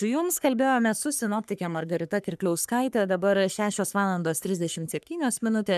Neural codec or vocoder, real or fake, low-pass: codec, 44.1 kHz, 3.4 kbps, Pupu-Codec; fake; 14.4 kHz